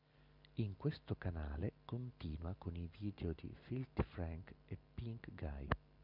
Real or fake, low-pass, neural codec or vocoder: real; 5.4 kHz; none